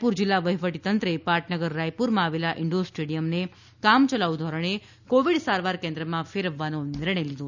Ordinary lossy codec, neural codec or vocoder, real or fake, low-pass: none; none; real; 7.2 kHz